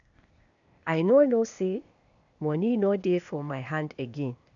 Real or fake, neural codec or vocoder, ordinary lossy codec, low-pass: fake; codec, 16 kHz, 0.8 kbps, ZipCodec; none; 7.2 kHz